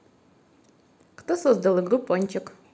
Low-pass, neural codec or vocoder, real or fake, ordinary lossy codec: none; none; real; none